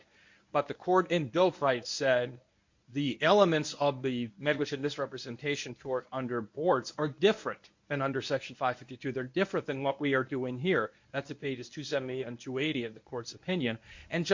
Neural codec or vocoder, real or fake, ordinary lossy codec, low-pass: codec, 24 kHz, 0.9 kbps, WavTokenizer, medium speech release version 1; fake; MP3, 48 kbps; 7.2 kHz